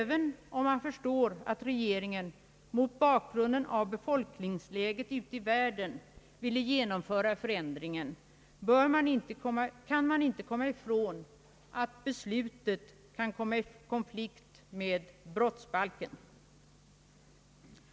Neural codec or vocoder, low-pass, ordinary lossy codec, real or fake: none; none; none; real